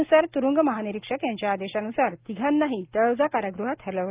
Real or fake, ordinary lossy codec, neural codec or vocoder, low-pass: real; Opus, 32 kbps; none; 3.6 kHz